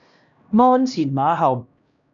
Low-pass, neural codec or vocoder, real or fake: 7.2 kHz; codec, 16 kHz, 1 kbps, X-Codec, HuBERT features, trained on LibriSpeech; fake